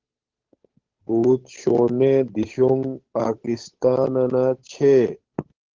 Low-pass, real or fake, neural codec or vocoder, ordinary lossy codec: 7.2 kHz; fake; codec, 16 kHz, 8 kbps, FunCodec, trained on Chinese and English, 25 frames a second; Opus, 16 kbps